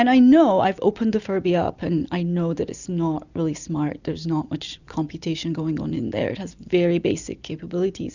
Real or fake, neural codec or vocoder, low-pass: real; none; 7.2 kHz